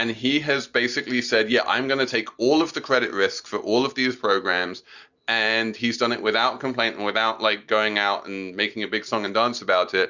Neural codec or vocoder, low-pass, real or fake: none; 7.2 kHz; real